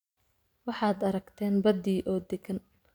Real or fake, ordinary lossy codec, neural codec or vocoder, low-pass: real; none; none; none